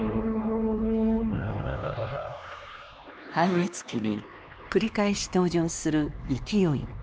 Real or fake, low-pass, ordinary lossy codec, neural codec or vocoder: fake; none; none; codec, 16 kHz, 2 kbps, X-Codec, HuBERT features, trained on LibriSpeech